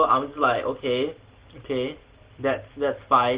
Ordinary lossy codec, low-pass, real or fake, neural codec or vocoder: Opus, 16 kbps; 3.6 kHz; real; none